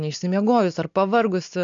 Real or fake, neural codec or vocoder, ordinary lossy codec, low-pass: real; none; AAC, 64 kbps; 7.2 kHz